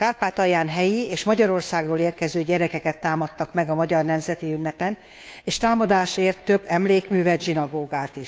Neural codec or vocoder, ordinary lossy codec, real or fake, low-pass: codec, 16 kHz, 2 kbps, FunCodec, trained on Chinese and English, 25 frames a second; none; fake; none